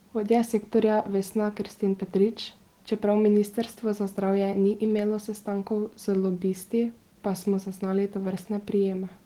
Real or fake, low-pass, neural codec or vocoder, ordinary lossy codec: real; 19.8 kHz; none; Opus, 16 kbps